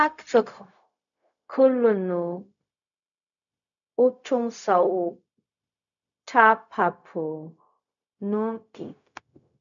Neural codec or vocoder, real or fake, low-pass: codec, 16 kHz, 0.4 kbps, LongCat-Audio-Codec; fake; 7.2 kHz